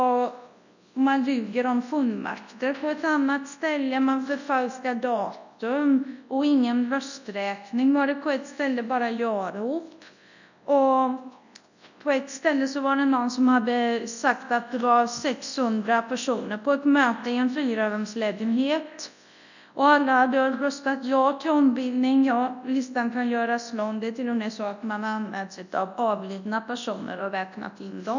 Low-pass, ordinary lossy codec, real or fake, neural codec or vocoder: 7.2 kHz; none; fake; codec, 24 kHz, 0.9 kbps, WavTokenizer, large speech release